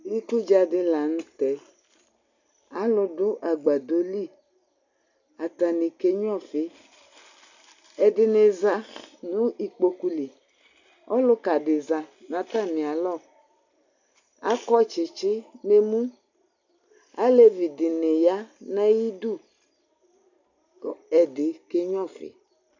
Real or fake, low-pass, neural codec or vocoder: real; 7.2 kHz; none